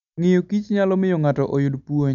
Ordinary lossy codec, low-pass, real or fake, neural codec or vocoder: none; 7.2 kHz; real; none